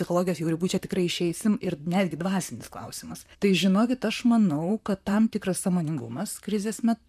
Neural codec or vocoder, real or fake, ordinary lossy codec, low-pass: vocoder, 44.1 kHz, 128 mel bands, Pupu-Vocoder; fake; MP3, 96 kbps; 14.4 kHz